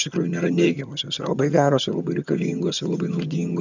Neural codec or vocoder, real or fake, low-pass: vocoder, 22.05 kHz, 80 mel bands, HiFi-GAN; fake; 7.2 kHz